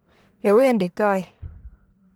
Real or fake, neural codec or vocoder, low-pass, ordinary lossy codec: fake; codec, 44.1 kHz, 1.7 kbps, Pupu-Codec; none; none